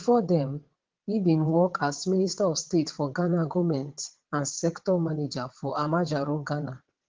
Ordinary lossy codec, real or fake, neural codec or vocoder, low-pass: Opus, 16 kbps; fake; vocoder, 22.05 kHz, 80 mel bands, WaveNeXt; 7.2 kHz